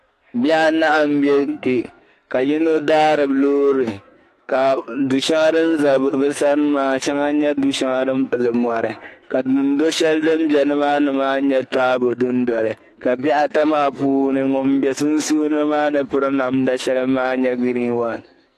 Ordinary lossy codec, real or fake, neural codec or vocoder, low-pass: AAC, 64 kbps; fake; codec, 44.1 kHz, 2.6 kbps, SNAC; 14.4 kHz